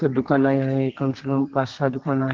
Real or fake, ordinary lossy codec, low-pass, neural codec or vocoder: fake; Opus, 16 kbps; 7.2 kHz; codec, 32 kHz, 1.9 kbps, SNAC